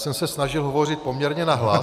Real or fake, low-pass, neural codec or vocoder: real; 14.4 kHz; none